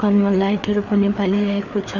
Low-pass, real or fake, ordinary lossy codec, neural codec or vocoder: 7.2 kHz; fake; none; codec, 16 kHz, 4 kbps, FreqCodec, larger model